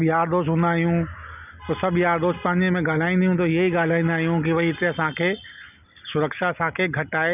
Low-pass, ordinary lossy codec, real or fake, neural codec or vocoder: 3.6 kHz; none; real; none